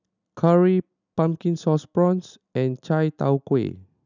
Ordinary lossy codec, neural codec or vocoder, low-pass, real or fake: none; none; 7.2 kHz; real